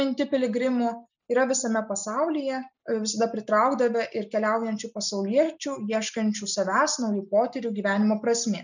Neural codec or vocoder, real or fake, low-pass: none; real; 7.2 kHz